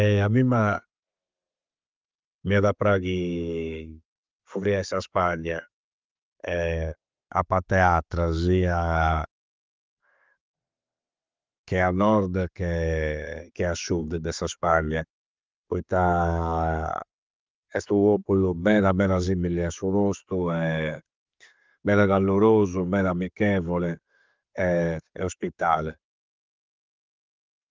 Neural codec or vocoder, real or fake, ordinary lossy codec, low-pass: none; real; Opus, 32 kbps; 7.2 kHz